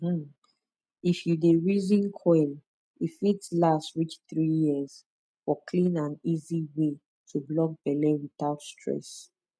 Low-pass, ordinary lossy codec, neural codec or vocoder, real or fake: none; none; none; real